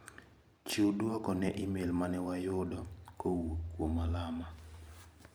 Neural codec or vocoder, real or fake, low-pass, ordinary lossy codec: vocoder, 44.1 kHz, 128 mel bands every 512 samples, BigVGAN v2; fake; none; none